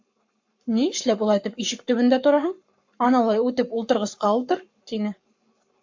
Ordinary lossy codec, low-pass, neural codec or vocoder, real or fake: MP3, 48 kbps; 7.2 kHz; codec, 44.1 kHz, 7.8 kbps, Pupu-Codec; fake